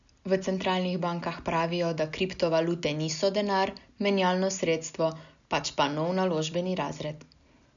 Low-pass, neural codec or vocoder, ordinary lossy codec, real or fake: 7.2 kHz; none; none; real